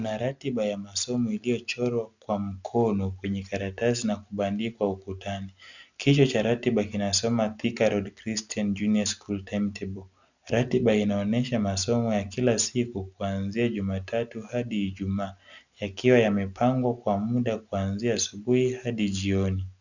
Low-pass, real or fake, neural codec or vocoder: 7.2 kHz; real; none